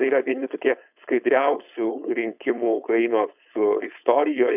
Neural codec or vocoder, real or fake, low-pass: codec, 16 kHz, 4.8 kbps, FACodec; fake; 3.6 kHz